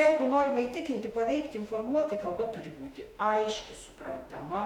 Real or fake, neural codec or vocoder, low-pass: fake; autoencoder, 48 kHz, 32 numbers a frame, DAC-VAE, trained on Japanese speech; 19.8 kHz